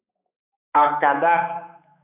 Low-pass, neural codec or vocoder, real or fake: 3.6 kHz; codec, 16 kHz, 4 kbps, X-Codec, HuBERT features, trained on balanced general audio; fake